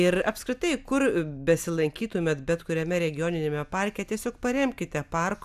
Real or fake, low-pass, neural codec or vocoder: real; 14.4 kHz; none